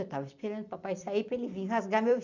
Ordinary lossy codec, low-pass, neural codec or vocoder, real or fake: none; 7.2 kHz; vocoder, 44.1 kHz, 128 mel bands every 256 samples, BigVGAN v2; fake